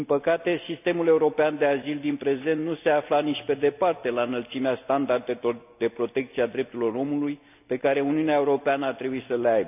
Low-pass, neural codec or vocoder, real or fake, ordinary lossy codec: 3.6 kHz; none; real; none